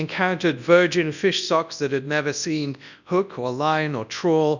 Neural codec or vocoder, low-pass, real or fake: codec, 24 kHz, 0.9 kbps, WavTokenizer, large speech release; 7.2 kHz; fake